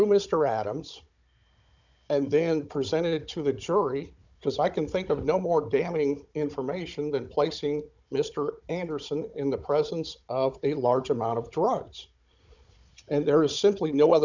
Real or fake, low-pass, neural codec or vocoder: fake; 7.2 kHz; codec, 16 kHz, 16 kbps, FunCodec, trained on Chinese and English, 50 frames a second